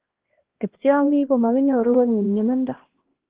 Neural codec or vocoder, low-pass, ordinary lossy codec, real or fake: codec, 16 kHz, 1 kbps, X-Codec, HuBERT features, trained on LibriSpeech; 3.6 kHz; Opus, 16 kbps; fake